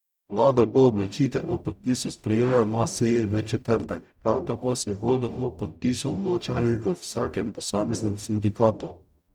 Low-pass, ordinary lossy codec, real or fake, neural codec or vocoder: 19.8 kHz; none; fake; codec, 44.1 kHz, 0.9 kbps, DAC